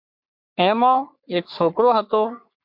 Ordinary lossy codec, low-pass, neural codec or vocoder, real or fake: MP3, 48 kbps; 5.4 kHz; codec, 44.1 kHz, 3.4 kbps, Pupu-Codec; fake